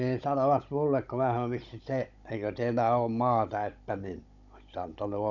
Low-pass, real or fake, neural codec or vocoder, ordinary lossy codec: 7.2 kHz; fake; codec, 16 kHz, 16 kbps, FunCodec, trained on Chinese and English, 50 frames a second; none